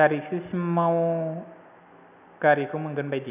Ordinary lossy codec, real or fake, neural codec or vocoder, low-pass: none; real; none; 3.6 kHz